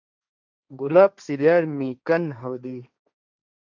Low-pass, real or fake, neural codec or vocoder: 7.2 kHz; fake; codec, 16 kHz, 1.1 kbps, Voila-Tokenizer